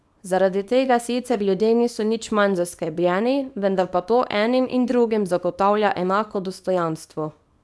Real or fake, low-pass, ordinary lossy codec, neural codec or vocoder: fake; none; none; codec, 24 kHz, 0.9 kbps, WavTokenizer, small release